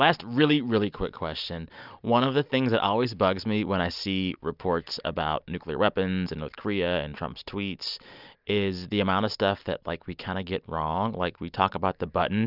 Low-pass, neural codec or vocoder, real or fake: 5.4 kHz; none; real